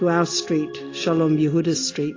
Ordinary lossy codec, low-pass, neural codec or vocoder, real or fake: AAC, 32 kbps; 7.2 kHz; none; real